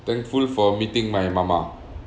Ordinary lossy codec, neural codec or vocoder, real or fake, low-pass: none; none; real; none